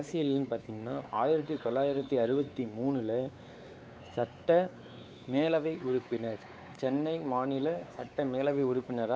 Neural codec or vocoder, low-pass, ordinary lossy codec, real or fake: codec, 16 kHz, 4 kbps, X-Codec, WavLM features, trained on Multilingual LibriSpeech; none; none; fake